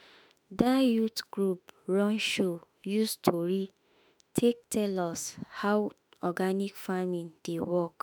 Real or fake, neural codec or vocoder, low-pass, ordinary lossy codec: fake; autoencoder, 48 kHz, 32 numbers a frame, DAC-VAE, trained on Japanese speech; none; none